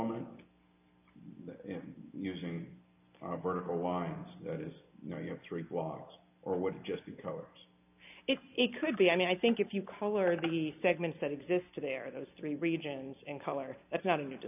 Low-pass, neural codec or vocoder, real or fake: 3.6 kHz; none; real